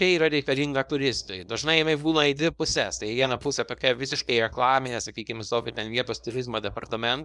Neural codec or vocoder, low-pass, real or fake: codec, 24 kHz, 0.9 kbps, WavTokenizer, small release; 10.8 kHz; fake